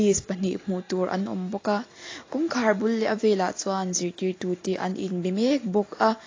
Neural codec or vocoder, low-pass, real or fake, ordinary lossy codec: none; 7.2 kHz; real; AAC, 32 kbps